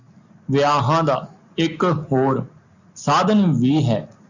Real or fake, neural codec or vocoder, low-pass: real; none; 7.2 kHz